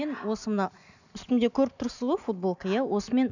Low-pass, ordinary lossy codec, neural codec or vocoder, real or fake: 7.2 kHz; none; none; real